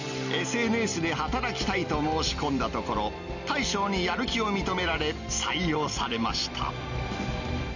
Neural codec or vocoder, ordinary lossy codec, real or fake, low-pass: none; none; real; 7.2 kHz